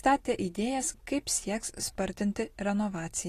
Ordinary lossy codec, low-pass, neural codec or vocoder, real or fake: AAC, 48 kbps; 14.4 kHz; none; real